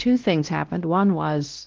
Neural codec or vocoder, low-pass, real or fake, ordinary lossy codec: codec, 16 kHz, 1 kbps, X-Codec, WavLM features, trained on Multilingual LibriSpeech; 7.2 kHz; fake; Opus, 16 kbps